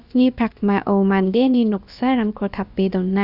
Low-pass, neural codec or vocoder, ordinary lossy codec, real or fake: 5.4 kHz; codec, 16 kHz, about 1 kbps, DyCAST, with the encoder's durations; Opus, 64 kbps; fake